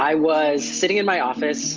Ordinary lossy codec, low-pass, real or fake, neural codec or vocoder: Opus, 24 kbps; 7.2 kHz; real; none